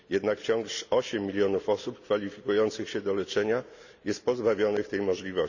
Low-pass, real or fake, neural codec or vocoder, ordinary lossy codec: 7.2 kHz; real; none; none